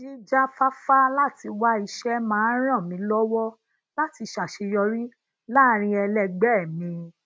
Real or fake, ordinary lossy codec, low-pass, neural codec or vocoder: real; none; none; none